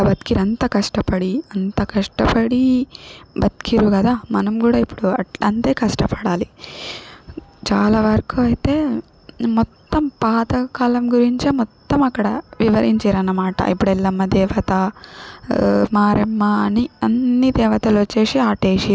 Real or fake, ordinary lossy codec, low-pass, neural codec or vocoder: real; none; none; none